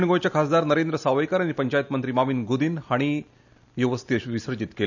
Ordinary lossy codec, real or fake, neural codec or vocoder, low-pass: none; real; none; 7.2 kHz